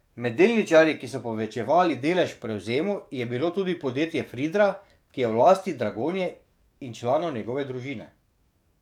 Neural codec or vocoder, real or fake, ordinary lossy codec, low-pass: codec, 44.1 kHz, 7.8 kbps, DAC; fake; none; 19.8 kHz